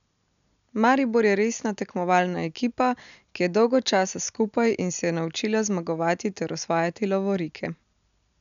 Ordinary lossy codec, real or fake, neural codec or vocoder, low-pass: MP3, 96 kbps; real; none; 7.2 kHz